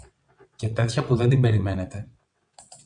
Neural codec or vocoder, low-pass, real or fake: vocoder, 22.05 kHz, 80 mel bands, WaveNeXt; 9.9 kHz; fake